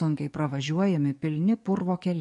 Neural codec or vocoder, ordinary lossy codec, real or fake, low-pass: codec, 44.1 kHz, 7.8 kbps, DAC; MP3, 48 kbps; fake; 10.8 kHz